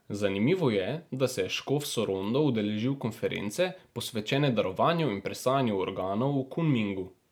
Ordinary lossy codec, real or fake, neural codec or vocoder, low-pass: none; real; none; none